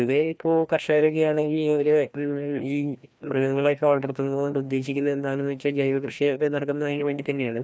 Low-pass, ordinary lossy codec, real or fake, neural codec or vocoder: none; none; fake; codec, 16 kHz, 1 kbps, FreqCodec, larger model